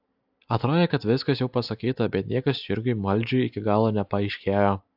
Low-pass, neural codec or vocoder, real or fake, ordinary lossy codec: 5.4 kHz; none; real; AAC, 48 kbps